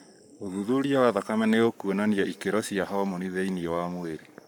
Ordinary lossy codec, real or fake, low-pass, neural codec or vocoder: none; fake; 19.8 kHz; codec, 44.1 kHz, 7.8 kbps, Pupu-Codec